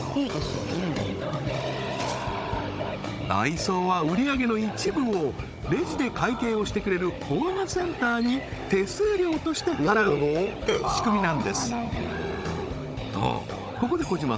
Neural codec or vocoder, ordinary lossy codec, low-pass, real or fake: codec, 16 kHz, 16 kbps, FunCodec, trained on Chinese and English, 50 frames a second; none; none; fake